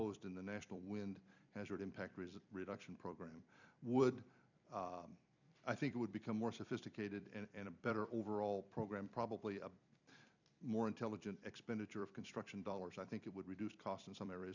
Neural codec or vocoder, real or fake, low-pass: vocoder, 44.1 kHz, 128 mel bands every 256 samples, BigVGAN v2; fake; 7.2 kHz